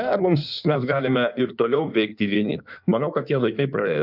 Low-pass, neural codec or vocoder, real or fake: 5.4 kHz; codec, 16 kHz in and 24 kHz out, 1.1 kbps, FireRedTTS-2 codec; fake